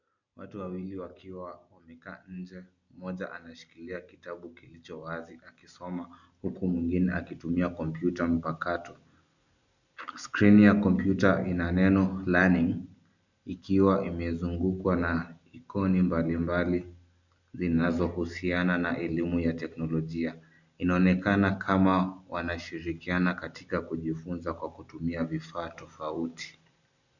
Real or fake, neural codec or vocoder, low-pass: real; none; 7.2 kHz